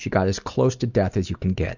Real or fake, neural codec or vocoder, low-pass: real; none; 7.2 kHz